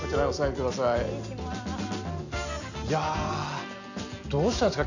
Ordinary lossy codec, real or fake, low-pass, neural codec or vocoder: none; real; 7.2 kHz; none